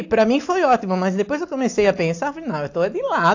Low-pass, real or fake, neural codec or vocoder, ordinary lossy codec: 7.2 kHz; real; none; AAC, 48 kbps